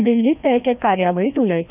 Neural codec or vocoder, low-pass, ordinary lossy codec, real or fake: codec, 16 kHz, 1 kbps, FreqCodec, larger model; 3.6 kHz; none; fake